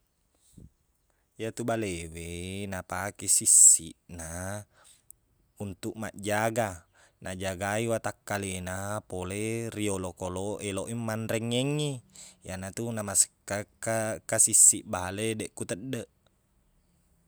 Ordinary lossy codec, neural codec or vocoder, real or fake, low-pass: none; none; real; none